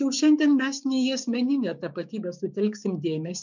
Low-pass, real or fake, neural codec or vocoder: 7.2 kHz; fake; codec, 16 kHz, 6 kbps, DAC